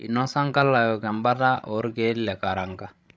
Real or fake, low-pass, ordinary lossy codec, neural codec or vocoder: fake; none; none; codec, 16 kHz, 16 kbps, FreqCodec, larger model